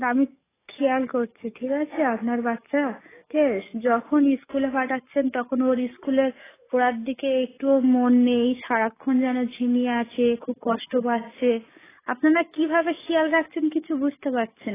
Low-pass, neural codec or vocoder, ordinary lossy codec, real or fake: 3.6 kHz; codec, 44.1 kHz, 7.8 kbps, DAC; AAC, 16 kbps; fake